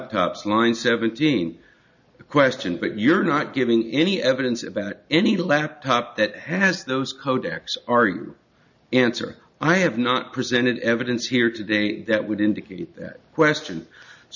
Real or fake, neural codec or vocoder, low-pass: real; none; 7.2 kHz